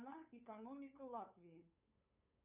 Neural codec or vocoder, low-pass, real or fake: codec, 16 kHz, 8 kbps, FunCodec, trained on LibriTTS, 25 frames a second; 3.6 kHz; fake